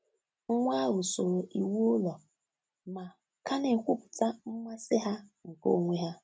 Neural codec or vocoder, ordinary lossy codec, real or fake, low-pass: none; none; real; none